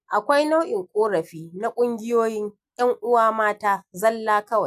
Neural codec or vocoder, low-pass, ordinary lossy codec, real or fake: none; 14.4 kHz; AAC, 96 kbps; real